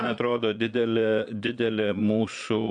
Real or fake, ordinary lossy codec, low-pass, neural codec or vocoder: fake; AAC, 64 kbps; 9.9 kHz; vocoder, 22.05 kHz, 80 mel bands, Vocos